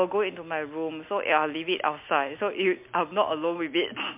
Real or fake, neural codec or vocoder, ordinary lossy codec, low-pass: real; none; MP3, 32 kbps; 3.6 kHz